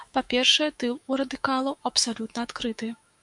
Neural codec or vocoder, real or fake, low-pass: autoencoder, 48 kHz, 128 numbers a frame, DAC-VAE, trained on Japanese speech; fake; 10.8 kHz